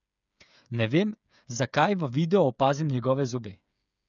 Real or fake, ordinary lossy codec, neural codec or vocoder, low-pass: fake; none; codec, 16 kHz, 8 kbps, FreqCodec, smaller model; 7.2 kHz